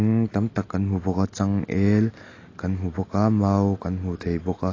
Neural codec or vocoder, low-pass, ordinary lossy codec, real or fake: none; 7.2 kHz; AAC, 32 kbps; real